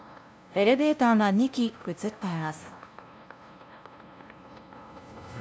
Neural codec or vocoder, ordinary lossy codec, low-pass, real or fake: codec, 16 kHz, 0.5 kbps, FunCodec, trained on LibriTTS, 25 frames a second; none; none; fake